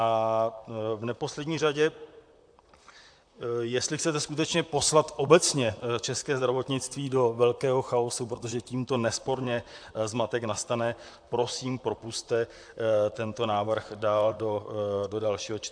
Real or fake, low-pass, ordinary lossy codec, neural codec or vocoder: fake; 9.9 kHz; AAC, 64 kbps; vocoder, 44.1 kHz, 128 mel bands, Pupu-Vocoder